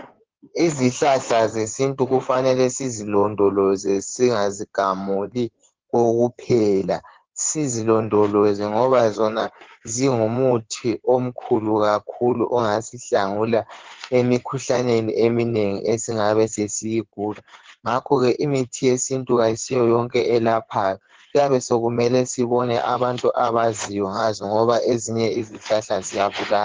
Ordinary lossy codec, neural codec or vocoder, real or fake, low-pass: Opus, 16 kbps; codec, 16 kHz in and 24 kHz out, 2.2 kbps, FireRedTTS-2 codec; fake; 7.2 kHz